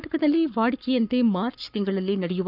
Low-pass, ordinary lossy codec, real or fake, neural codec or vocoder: 5.4 kHz; none; fake; codec, 44.1 kHz, 7.8 kbps, Pupu-Codec